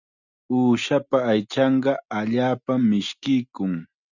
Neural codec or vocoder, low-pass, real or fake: none; 7.2 kHz; real